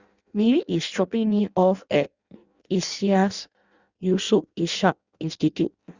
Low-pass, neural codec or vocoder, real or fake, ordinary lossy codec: 7.2 kHz; codec, 16 kHz in and 24 kHz out, 0.6 kbps, FireRedTTS-2 codec; fake; Opus, 64 kbps